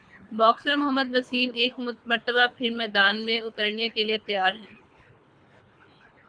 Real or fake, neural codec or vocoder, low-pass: fake; codec, 24 kHz, 3 kbps, HILCodec; 9.9 kHz